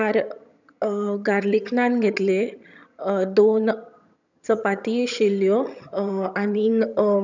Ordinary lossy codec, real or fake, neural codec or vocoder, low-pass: none; fake; vocoder, 22.05 kHz, 80 mel bands, HiFi-GAN; 7.2 kHz